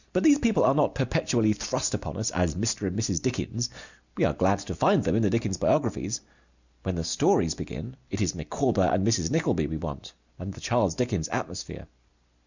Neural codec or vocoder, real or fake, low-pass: none; real; 7.2 kHz